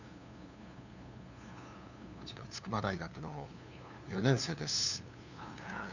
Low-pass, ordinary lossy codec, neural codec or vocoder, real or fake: 7.2 kHz; none; codec, 16 kHz, 2 kbps, FunCodec, trained on LibriTTS, 25 frames a second; fake